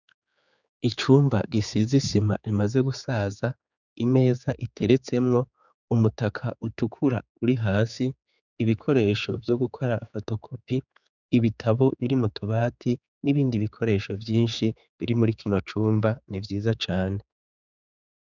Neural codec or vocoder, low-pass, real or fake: codec, 16 kHz, 4 kbps, X-Codec, HuBERT features, trained on general audio; 7.2 kHz; fake